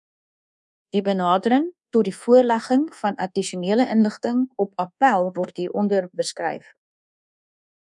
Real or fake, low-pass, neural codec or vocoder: fake; 10.8 kHz; codec, 24 kHz, 1.2 kbps, DualCodec